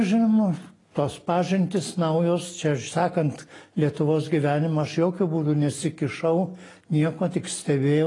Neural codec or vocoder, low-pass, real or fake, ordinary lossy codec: none; 10.8 kHz; real; AAC, 32 kbps